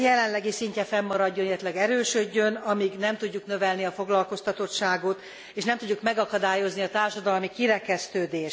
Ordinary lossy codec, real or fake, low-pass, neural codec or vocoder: none; real; none; none